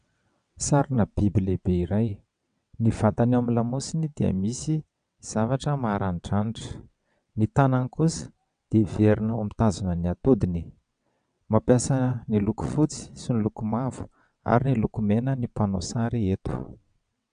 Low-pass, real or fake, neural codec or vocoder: 9.9 kHz; fake; vocoder, 22.05 kHz, 80 mel bands, WaveNeXt